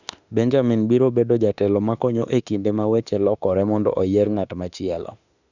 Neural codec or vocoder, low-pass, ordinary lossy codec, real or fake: autoencoder, 48 kHz, 32 numbers a frame, DAC-VAE, trained on Japanese speech; 7.2 kHz; none; fake